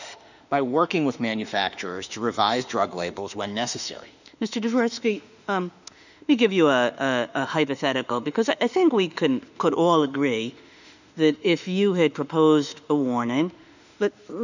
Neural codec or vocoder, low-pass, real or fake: autoencoder, 48 kHz, 32 numbers a frame, DAC-VAE, trained on Japanese speech; 7.2 kHz; fake